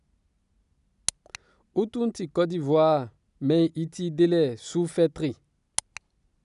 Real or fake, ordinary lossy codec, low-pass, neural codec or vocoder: real; none; 10.8 kHz; none